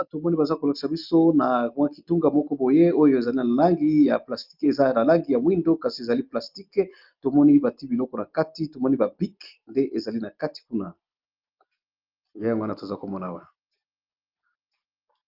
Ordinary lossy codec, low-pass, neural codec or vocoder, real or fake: Opus, 32 kbps; 5.4 kHz; none; real